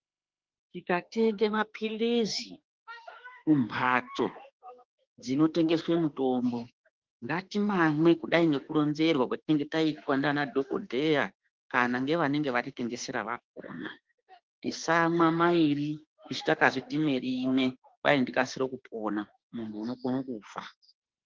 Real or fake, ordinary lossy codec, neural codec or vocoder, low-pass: fake; Opus, 16 kbps; autoencoder, 48 kHz, 32 numbers a frame, DAC-VAE, trained on Japanese speech; 7.2 kHz